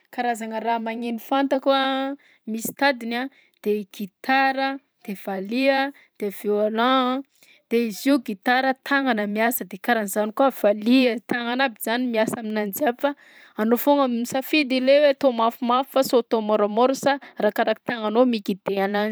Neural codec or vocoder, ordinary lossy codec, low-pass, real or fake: vocoder, 44.1 kHz, 128 mel bands every 512 samples, BigVGAN v2; none; none; fake